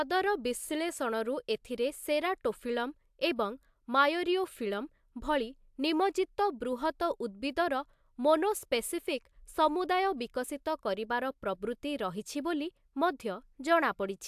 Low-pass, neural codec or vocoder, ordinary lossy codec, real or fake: 14.4 kHz; none; AAC, 96 kbps; real